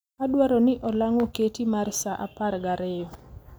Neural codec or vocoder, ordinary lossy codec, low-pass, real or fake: none; none; none; real